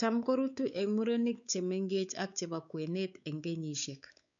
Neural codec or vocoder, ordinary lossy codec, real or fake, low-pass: codec, 16 kHz, 4 kbps, X-Codec, WavLM features, trained on Multilingual LibriSpeech; none; fake; 7.2 kHz